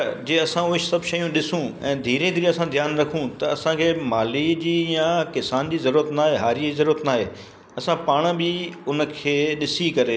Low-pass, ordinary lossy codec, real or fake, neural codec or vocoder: none; none; real; none